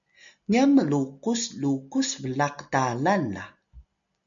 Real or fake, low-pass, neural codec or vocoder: real; 7.2 kHz; none